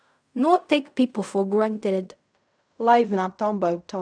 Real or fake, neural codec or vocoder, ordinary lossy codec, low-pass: fake; codec, 16 kHz in and 24 kHz out, 0.4 kbps, LongCat-Audio-Codec, fine tuned four codebook decoder; none; 9.9 kHz